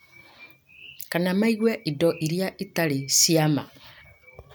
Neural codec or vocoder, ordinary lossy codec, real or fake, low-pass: none; none; real; none